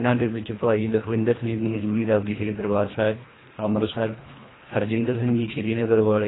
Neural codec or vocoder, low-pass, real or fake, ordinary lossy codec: codec, 24 kHz, 1.5 kbps, HILCodec; 7.2 kHz; fake; AAC, 16 kbps